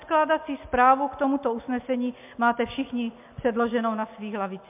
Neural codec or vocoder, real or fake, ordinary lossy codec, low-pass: none; real; MP3, 32 kbps; 3.6 kHz